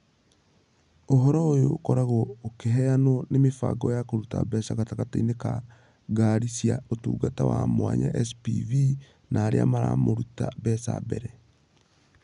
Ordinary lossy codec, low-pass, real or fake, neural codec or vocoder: none; 10.8 kHz; real; none